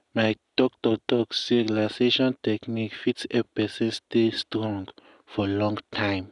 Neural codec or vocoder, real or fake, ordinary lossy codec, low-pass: none; real; none; 10.8 kHz